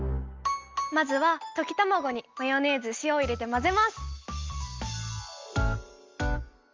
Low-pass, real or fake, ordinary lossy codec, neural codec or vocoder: 7.2 kHz; real; Opus, 32 kbps; none